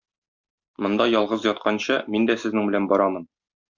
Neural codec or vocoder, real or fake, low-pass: none; real; 7.2 kHz